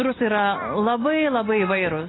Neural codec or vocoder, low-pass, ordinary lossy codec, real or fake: none; 7.2 kHz; AAC, 16 kbps; real